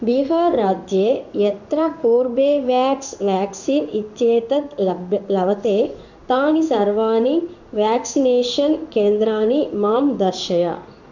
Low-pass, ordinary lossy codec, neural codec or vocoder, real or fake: 7.2 kHz; none; codec, 16 kHz in and 24 kHz out, 1 kbps, XY-Tokenizer; fake